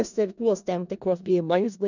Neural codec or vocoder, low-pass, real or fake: codec, 16 kHz in and 24 kHz out, 0.4 kbps, LongCat-Audio-Codec, four codebook decoder; 7.2 kHz; fake